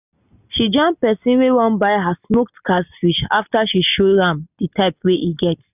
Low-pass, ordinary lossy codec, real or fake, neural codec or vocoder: 3.6 kHz; none; real; none